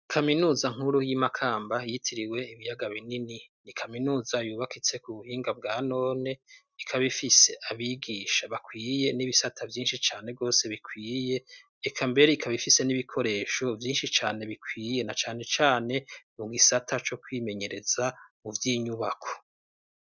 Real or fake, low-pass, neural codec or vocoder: real; 7.2 kHz; none